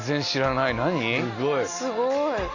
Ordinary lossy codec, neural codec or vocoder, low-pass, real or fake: Opus, 64 kbps; none; 7.2 kHz; real